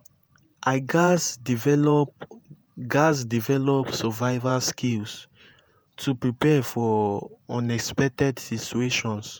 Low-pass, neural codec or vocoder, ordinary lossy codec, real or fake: none; vocoder, 48 kHz, 128 mel bands, Vocos; none; fake